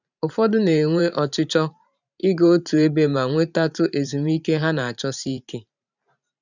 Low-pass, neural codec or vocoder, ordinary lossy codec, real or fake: 7.2 kHz; vocoder, 44.1 kHz, 128 mel bands every 512 samples, BigVGAN v2; none; fake